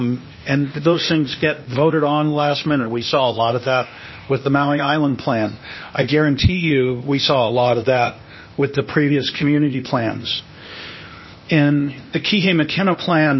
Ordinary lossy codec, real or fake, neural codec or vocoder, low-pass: MP3, 24 kbps; fake; codec, 16 kHz, 0.8 kbps, ZipCodec; 7.2 kHz